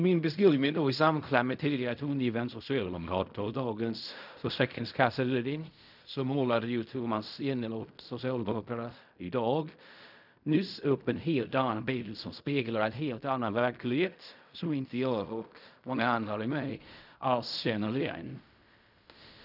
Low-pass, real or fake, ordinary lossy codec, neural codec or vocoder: 5.4 kHz; fake; none; codec, 16 kHz in and 24 kHz out, 0.4 kbps, LongCat-Audio-Codec, fine tuned four codebook decoder